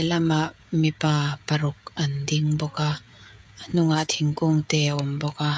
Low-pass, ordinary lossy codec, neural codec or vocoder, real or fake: none; none; codec, 16 kHz, 16 kbps, FreqCodec, smaller model; fake